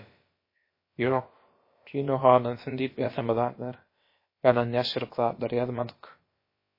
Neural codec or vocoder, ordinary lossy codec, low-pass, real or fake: codec, 16 kHz, about 1 kbps, DyCAST, with the encoder's durations; MP3, 24 kbps; 5.4 kHz; fake